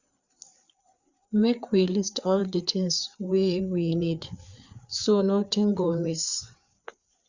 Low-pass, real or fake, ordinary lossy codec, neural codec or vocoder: 7.2 kHz; fake; Opus, 64 kbps; codec, 16 kHz, 4 kbps, FreqCodec, larger model